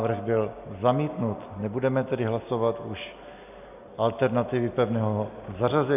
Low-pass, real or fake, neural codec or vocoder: 3.6 kHz; real; none